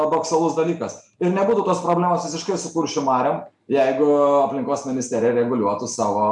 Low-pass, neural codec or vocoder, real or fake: 10.8 kHz; none; real